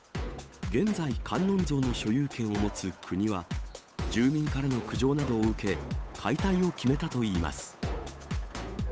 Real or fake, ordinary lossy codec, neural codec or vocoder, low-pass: fake; none; codec, 16 kHz, 8 kbps, FunCodec, trained on Chinese and English, 25 frames a second; none